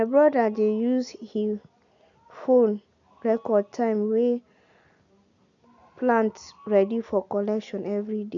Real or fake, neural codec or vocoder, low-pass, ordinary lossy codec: real; none; 7.2 kHz; none